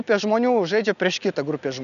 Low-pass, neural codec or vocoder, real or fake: 7.2 kHz; none; real